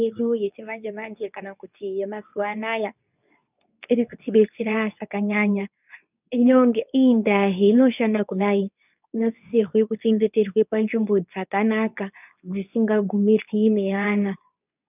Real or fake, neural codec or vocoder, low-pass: fake; codec, 24 kHz, 0.9 kbps, WavTokenizer, medium speech release version 2; 3.6 kHz